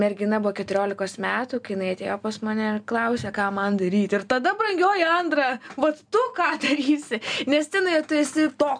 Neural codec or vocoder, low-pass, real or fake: none; 9.9 kHz; real